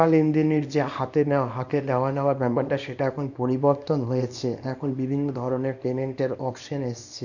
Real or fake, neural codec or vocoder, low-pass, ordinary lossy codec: fake; codec, 24 kHz, 0.9 kbps, WavTokenizer, small release; 7.2 kHz; none